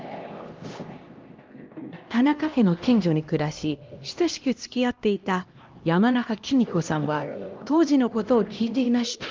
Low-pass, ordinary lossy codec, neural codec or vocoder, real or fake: 7.2 kHz; Opus, 24 kbps; codec, 16 kHz, 1 kbps, X-Codec, HuBERT features, trained on LibriSpeech; fake